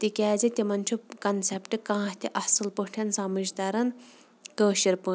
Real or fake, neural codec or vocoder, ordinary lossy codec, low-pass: real; none; none; none